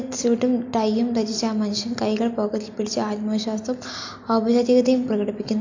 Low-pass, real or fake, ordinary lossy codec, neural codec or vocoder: 7.2 kHz; real; AAC, 48 kbps; none